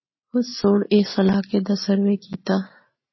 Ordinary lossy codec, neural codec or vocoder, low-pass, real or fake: MP3, 24 kbps; none; 7.2 kHz; real